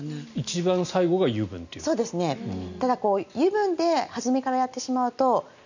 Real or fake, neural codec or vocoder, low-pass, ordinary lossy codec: real; none; 7.2 kHz; none